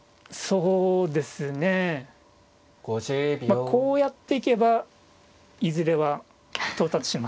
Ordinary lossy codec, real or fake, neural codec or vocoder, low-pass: none; real; none; none